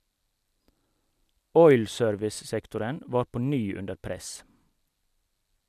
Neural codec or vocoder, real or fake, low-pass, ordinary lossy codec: none; real; 14.4 kHz; none